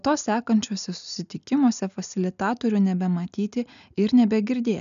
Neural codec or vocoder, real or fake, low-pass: none; real; 7.2 kHz